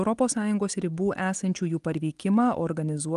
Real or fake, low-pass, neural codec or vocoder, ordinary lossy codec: real; 10.8 kHz; none; Opus, 24 kbps